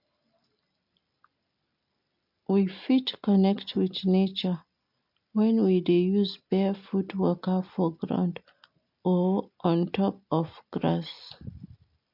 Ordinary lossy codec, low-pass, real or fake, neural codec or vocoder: MP3, 48 kbps; 5.4 kHz; real; none